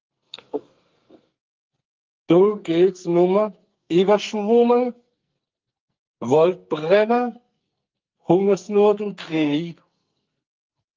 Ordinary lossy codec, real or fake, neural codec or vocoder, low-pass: Opus, 24 kbps; fake; codec, 44.1 kHz, 2.6 kbps, SNAC; 7.2 kHz